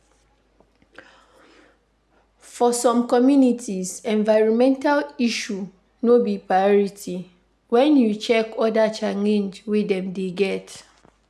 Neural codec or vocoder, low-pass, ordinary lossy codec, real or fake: none; none; none; real